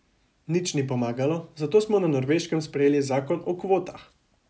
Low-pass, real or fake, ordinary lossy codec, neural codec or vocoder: none; real; none; none